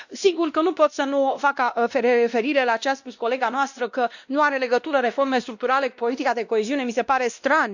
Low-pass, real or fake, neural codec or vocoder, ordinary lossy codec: 7.2 kHz; fake; codec, 16 kHz, 1 kbps, X-Codec, WavLM features, trained on Multilingual LibriSpeech; none